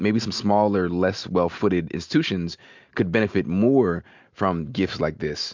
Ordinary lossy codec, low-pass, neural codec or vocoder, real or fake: MP3, 64 kbps; 7.2 kHz; none; real